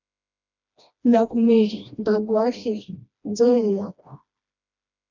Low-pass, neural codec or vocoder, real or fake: 7.2 kHz; codec, 16 kHz, 1 kbps, FreqCodec, smaller model; fake